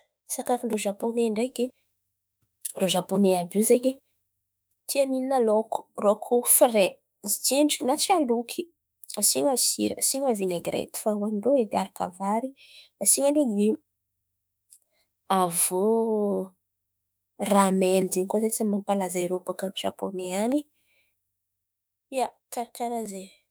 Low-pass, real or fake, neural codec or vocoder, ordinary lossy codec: none; fake; autoencoder, 48 kHz, 32 numbers a frame, DAC-VAE, trained on Japanese speech; none